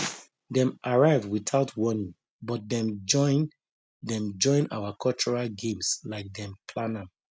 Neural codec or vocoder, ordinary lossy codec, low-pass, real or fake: none; none; none; real